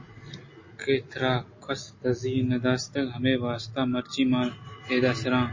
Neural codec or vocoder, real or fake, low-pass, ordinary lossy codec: none; real; 7.2 kHz; MP3, 32 kbps